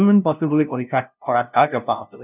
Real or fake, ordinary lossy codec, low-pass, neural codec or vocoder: fake; none; 3.6 kHz; codec, 16 kHz, 0.5 kbps, FunCodec, trained on LibriTTS, 25 frames a second